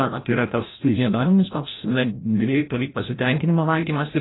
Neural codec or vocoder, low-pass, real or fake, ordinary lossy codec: codec, 16 kHz, 0.5 kbps, FreqCodec, larger model; 7.2 kHz; fake; AAC, 16 kbps